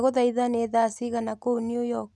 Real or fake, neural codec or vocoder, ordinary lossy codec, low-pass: real; none; none; none